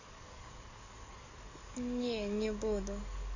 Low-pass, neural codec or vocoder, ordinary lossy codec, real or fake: 7.2 kHz; codec, 44.1 kHz, 7.8 kbps, DAC; none; fake